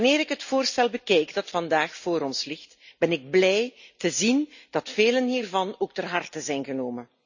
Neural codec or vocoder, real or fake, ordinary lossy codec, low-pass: none; real; none; 7.2 kHz